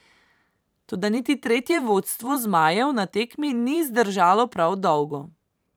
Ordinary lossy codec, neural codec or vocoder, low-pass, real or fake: none; vocoder, 44.1 kHz, 128 mel bands every 512 samples, BigVGAN v2; none; fake